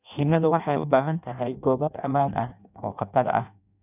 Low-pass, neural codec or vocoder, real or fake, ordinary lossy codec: 3.6 kHz; codec, 16 kHz in and 24 kHz out, 0.6 kbps, FireRedTTS-2 codec; fake; none